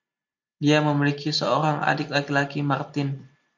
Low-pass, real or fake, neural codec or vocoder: 7.2 kHz; real; none